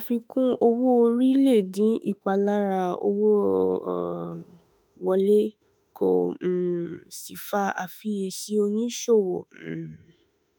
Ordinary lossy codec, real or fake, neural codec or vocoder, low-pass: none; fake; autoencoder, 48 kHz, 32 numbers a frame, DAC-VAE, trained on Japanese speech; none